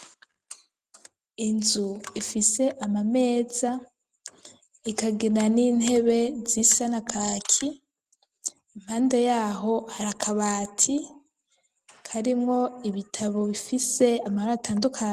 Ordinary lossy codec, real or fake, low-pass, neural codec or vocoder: Opus, 24 kbps; real; 14.4 kHz; none